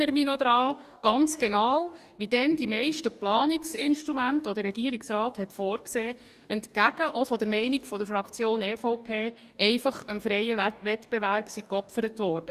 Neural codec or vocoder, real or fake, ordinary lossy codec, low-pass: codec, 44.1 kHz, 2.6 kbps, DAC; fake; Opus, 64 kbps; 14.4 kHz